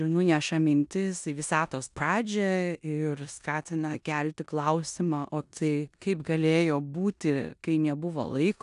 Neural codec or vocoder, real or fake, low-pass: codec, 16 kHz in and 24 kHz out, 0.9 kbps, LongCat-Audio-Codec, four codebook decoder; fake; 10.8 kHz